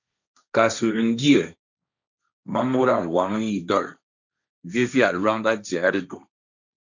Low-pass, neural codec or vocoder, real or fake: 7.2 kHz; codec, 16 kHz, 1.1 kbps, Voila-Tokenizer; fake